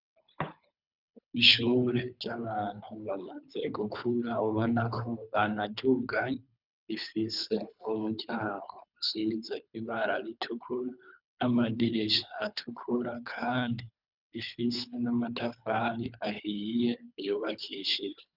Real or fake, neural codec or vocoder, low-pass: fake; codec, 24 kHz, 3 kbps, HILCodec; 5.4 kHz